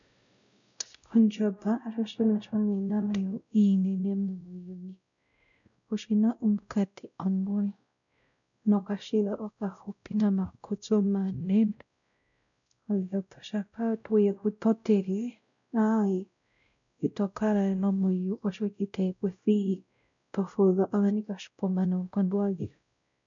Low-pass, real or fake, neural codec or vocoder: 7.2 kHz; fake; codec, 16 kHz, 0.5 kbps, X-Codec, WavLM features, trained on Multilingual LibriSpeech